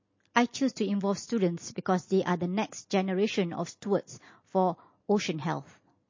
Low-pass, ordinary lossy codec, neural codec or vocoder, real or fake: 7.2 kHz; MP3, 32 kbps; none; real